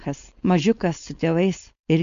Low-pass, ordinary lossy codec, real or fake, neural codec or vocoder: 7.2 kHz; MP3, 64 kbps; fake; codec, 16 kHz, 4.8 kbps, FACodec